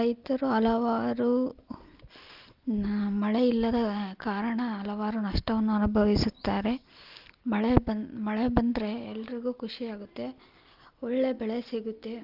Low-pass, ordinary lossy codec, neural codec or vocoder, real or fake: 5.4 kHz; Opus, 32 kbps; none; real